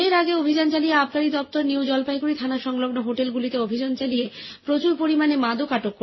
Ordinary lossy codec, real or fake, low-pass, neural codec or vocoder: MP3, 24 kbps; real; 7.2 kHz; none